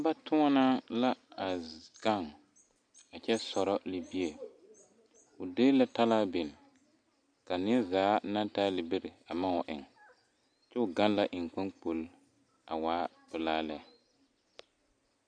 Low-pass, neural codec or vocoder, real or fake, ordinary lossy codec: 9.9 kHz; none; real; MP3, 96 kbps